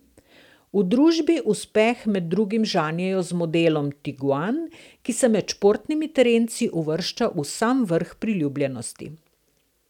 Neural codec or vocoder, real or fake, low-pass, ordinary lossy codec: none; real; 19.8 kHz; none